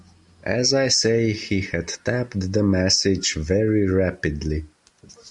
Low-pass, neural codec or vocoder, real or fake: 10.8 kHz; none; real